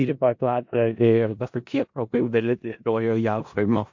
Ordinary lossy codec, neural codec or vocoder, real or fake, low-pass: MP3, 48 kbps; codec, 16 kHz in and 24 kHz out, 0.4 kbps, LongCat-Audio-Codec, four codebook decoder; fake; 7.2 kHz